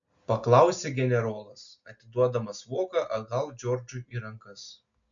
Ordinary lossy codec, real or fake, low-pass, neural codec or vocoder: MP3, 96 kbps; real; 7.2 kHz; none